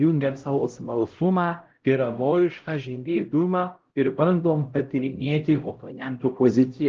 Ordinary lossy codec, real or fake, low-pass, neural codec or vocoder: Opus, 16 kbps; fake; 7.2 kHz; codec, 16 kHz, 0.5 kbps, X-Codec, HuBERT features, trained on LibriSpeech